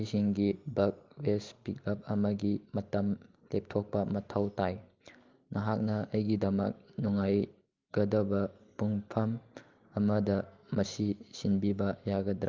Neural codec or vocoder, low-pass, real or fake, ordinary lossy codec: none; 7.2 kHz; real; Opus, 24 kbps